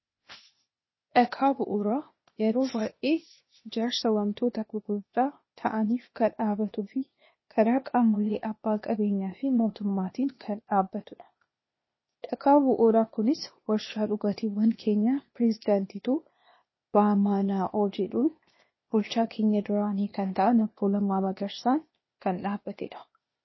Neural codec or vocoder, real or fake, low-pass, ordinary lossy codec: codec, 16 kHz, 0.8 kbps, ZipCodec; fake; 7.2 kHz; MP3, 24 kbps